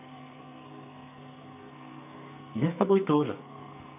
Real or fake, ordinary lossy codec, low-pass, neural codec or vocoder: fake; none; 3.6 kHz; codec, 44.1 kHz, 2.6 kbps, SNAC